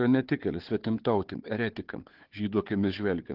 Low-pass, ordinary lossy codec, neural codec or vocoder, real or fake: 5.4 kHz; Opus, 16 kbps; codec, 16 kHz, 4 kbps, FunCodec, trained on Chinese and English, 50 frames a second; fake